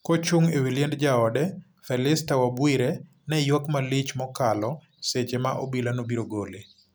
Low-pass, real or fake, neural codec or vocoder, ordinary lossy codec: none; real; none; none